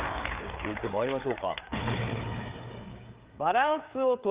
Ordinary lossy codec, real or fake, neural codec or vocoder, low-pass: Opus, 32 kbps; fake; codec, 16 kHz, 4 kbps, FreqCodec, larger model; 3.6 kHz